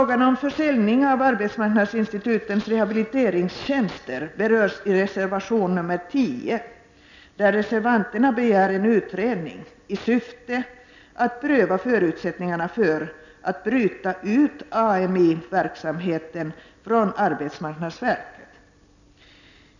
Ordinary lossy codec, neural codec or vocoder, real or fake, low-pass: none; none; real; 7.2 kHz